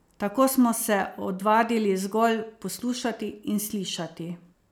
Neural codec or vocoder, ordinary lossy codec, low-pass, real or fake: none; none; none; real